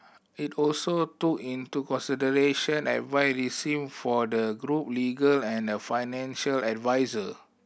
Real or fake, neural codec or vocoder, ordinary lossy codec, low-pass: real; none; none; none